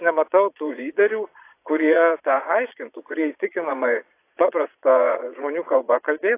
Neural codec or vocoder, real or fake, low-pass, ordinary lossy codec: vocoder, 22.05 kHz, 80 mel bands, Vocos; fake; 3.6 kHz; AAC, 24 kbps